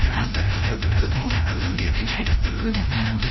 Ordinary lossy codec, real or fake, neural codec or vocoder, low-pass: MP3, 24 kbps; fake; codec, 16 kHz, 0.5 kbps, FreqCodec, larger model; 7.2 kHz